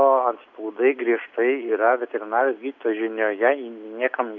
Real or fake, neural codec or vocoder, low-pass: real; none; 7.2 kHz